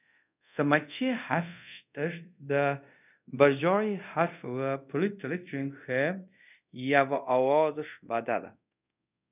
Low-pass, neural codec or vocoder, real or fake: 3.6 kHz; codec, 24 kHz, 0.5 kbps, DualCodec; fake